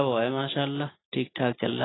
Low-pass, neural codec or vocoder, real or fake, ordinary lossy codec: 7.2 kHz; none; real; AAC, 16 kbps